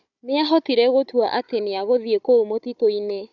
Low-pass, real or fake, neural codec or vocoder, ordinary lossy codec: 7.2 kHz; fake; codec, 16 kHz, 16 kbps, FunCodec, trained on Chinese and English, 50 frames a second; none